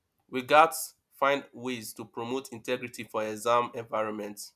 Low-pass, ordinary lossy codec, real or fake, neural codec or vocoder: 14.4 kHz; none; real; none